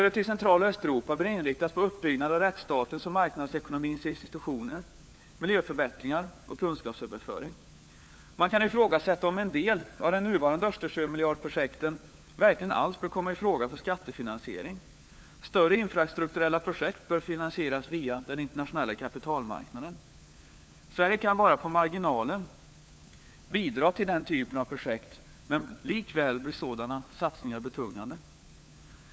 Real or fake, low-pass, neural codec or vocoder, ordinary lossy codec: fake; none; codec, 16 kHz, 4 kbps, FunCodec, trained on LibriTTS, 50 frames a second; none